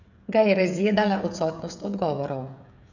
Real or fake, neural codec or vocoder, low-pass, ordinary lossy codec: fake; codec, 16 kHz, 16 kbps, FreqCodec, smaller model; 7.2 kHz; none